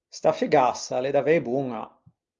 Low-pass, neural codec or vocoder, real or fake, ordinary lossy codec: 7.2 kHz; none; real; Opus, 32 kbps